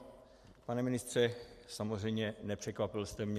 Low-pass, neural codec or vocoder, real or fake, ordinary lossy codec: 14.4 kHz; none; real; MP3, 64 kbps